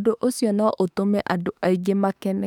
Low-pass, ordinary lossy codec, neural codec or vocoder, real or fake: 19.8 kHz; none; autoencoder, 48 kHz, 32 numbers a frame, DAC-VAE, trained on Japanese speech; fake